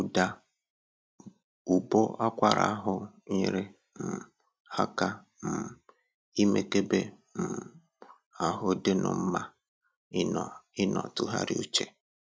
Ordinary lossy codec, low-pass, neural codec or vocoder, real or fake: none; none; none; real